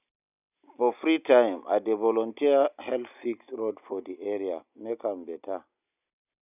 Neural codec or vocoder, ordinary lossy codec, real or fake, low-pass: none; none; real; 3.6 kHz